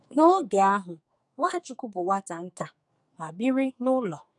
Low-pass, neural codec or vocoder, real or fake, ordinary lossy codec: 10.8 kHz; codec, 44.1 kHz, 2.6 kbps, SNAC; fake; none